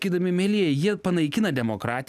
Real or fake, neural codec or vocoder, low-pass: real; none; 14.4 kHz